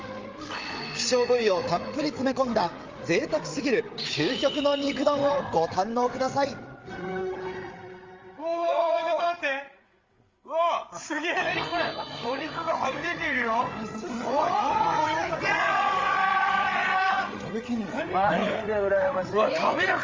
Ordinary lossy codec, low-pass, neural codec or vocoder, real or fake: Opus, 32 kbps; 7.2 kHz; codec, 16 kHz, 8 kbps, FreqCodec, larger model; fake